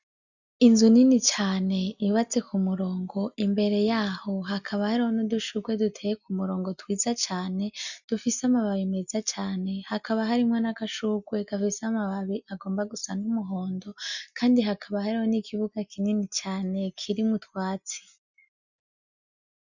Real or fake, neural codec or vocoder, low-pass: real; none; 7.2 kHz